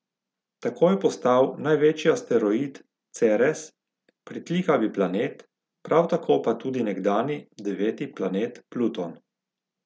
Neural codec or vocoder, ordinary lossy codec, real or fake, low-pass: none; none; real; none